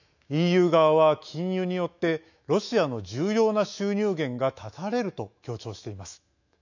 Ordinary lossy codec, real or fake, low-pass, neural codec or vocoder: none; fake; 7.2 kHz; autoencoder, 48 kHz, 128 numbers a frame, DAC-VAE, trained on Japanese speech